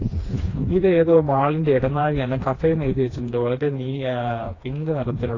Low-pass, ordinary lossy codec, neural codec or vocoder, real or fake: 7.2 kHz; AAC, 32 kbps; codec, 16 kHz, 2 kbps, FreqCodec, smaller model; fake